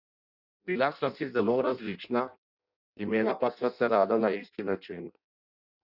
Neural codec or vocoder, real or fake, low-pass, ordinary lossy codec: codec, 16 kHz in and 24 kHz out, 0.6 kbps, FireRedTTS-2 codec; fake; 5.4 kHz; none